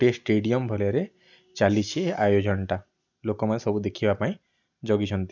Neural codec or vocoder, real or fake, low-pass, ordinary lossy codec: none; real; 7.2 kHz; none